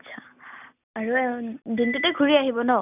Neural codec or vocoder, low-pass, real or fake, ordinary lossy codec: none; 3.6 kHz; real; none